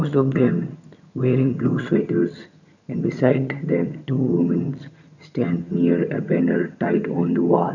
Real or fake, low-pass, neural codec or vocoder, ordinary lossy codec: fake; 7.2 kHz; vocoder, 22.05 kHz, 80 mel bands, HiFi-GAN; none